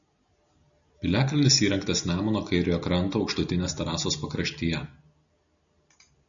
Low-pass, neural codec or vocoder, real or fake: 7.2 kHz; none; real